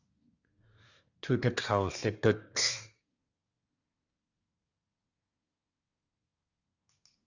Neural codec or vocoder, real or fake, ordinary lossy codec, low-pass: codec, 24 kHz, 1 kbps, SNAC; fake; Opus, 64 kbps; 7.2 kHz